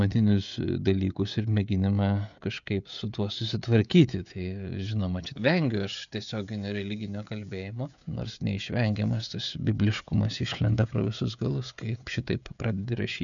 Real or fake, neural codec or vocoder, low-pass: fake; codec, 16 kHz, 16 kbps, FreqCodec, smaller model; 7.2 kHz